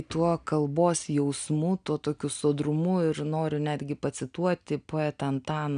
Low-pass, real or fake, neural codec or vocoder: 9.9 kHz; real; none